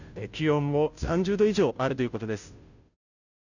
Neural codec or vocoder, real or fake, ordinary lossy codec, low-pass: codec, 16 kHz, 0.5 kbps, FunCodec, trained on Chinese and English, 25 frames a second; fake; none; 7.2 kHz